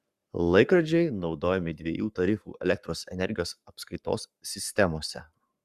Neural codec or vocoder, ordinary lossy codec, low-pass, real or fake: codec, 44.1 kHz, 7.8 kbps, Pupu-Codec; Opus, 64 kbps; 14.4 kHz; fake